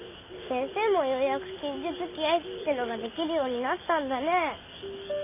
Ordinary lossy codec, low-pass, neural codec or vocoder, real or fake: none; 3.6 kHz; none; real